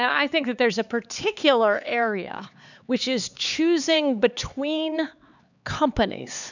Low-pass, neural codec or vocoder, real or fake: 7.2 kHz; codec, 16 kHz, 4 kbps, X-Codec, HuBERT features, trained on LibriSpeech; fake